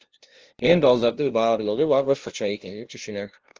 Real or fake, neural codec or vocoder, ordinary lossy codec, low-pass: fake; codec, 16 kHz, 0.5 kbps, FunCodec, trained on LibriTTS, 25 frames a second; Opus, 16 kbps; 7.2 kHz